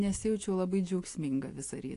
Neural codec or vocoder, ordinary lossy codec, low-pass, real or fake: none; AAC, 48 kbps; 10.8 kHz; real